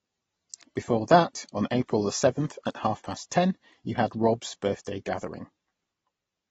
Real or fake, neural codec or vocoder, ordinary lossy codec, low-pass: real; none; AAC, 24 kbps; 10.8 kHz